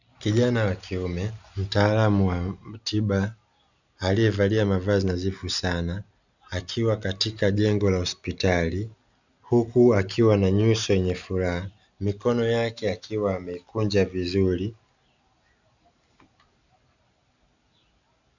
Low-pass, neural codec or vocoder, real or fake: 7.2 kHz; none; real